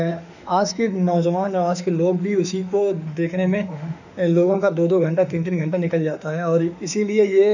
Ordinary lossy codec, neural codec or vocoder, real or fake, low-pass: none; autoencoder, 48 kHz, 32 numbers a frame, DAC-VAE, trained on Japanese speech; fake; 7.2 kHz